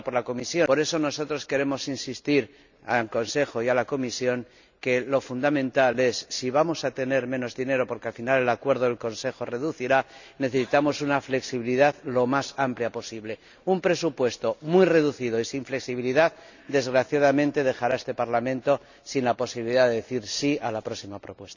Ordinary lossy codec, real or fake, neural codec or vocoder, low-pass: none; real; none; 7.2 kHz